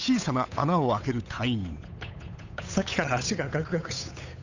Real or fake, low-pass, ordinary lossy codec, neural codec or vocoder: fake; 7.2 kHz; none; codec, 16 kHz, 8 kbps, FunCodec, trained on Chinese and English, 25 frames a second